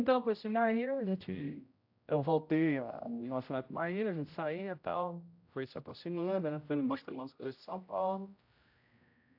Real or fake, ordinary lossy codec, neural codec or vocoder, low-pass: fake; AAC, 48 kbps; codec, 16 kHz, 0.5 kbps, X-Codec, HuBERT features, trained on general audio; 5.4 kHz